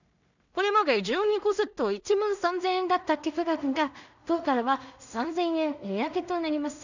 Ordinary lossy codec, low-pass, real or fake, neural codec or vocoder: none; 7.2 kHz; fake; codec, 16 kHz in and 24 kHz out, 0.4 kbps, LongCat-Audio-Codec, two codebook decoder